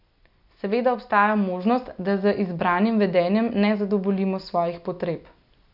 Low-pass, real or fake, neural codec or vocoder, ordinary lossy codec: 5.4 kHz; real; none; none